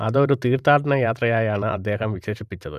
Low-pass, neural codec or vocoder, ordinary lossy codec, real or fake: 14.4 kHz; vocoder, 44.1 kHz, 128 mel bands every 512 samples, BigVGAN v2; none; fake